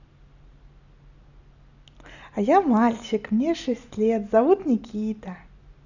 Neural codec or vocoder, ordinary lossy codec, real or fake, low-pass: none; none; real; 7.2 kHz